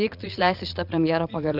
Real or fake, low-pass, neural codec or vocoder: fake; 5.4 kHz; vocoder, 22.05 kHz, 80 mel bands, Vocos